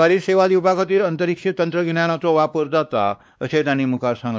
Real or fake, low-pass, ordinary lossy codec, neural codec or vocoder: fake; none; none; codec, 16 kHz, 2 kbps, X-Codec, WavLM features, trained on Multilingual LibriSpeech